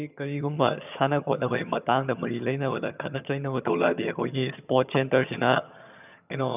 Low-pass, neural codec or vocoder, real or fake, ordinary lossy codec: 3.6 kHz; vocoder, 22.05 kHz, 80 mel bands, HiFi-GAN; fake; none